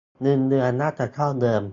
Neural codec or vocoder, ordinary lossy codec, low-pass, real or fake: none; AAC, 64 kbps; 7.2 kHz; real